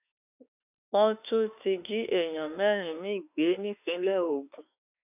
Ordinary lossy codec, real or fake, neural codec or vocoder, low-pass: none; fake; autoencoder, 48 kHz, 32 numbers a frame, DAC-VAE, trained on Japanese speech; 3.6 kHz